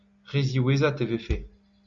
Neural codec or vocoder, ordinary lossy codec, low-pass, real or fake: none; Opus, 64 kbps; 7.2 kHz; real